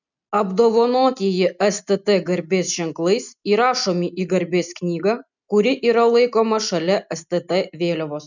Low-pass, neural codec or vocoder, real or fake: 7.2 kHz; none; real